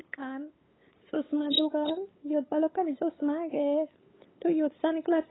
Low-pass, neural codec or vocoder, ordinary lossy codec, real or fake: 7.2 kHz; codec, 16 kHz, 8 kbps, FunCodec, trained on LibriTTS, 25 frames a second; AAC, 16 kbps; fake